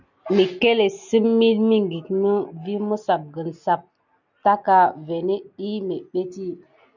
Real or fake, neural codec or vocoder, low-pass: real; none; 7.2 kHz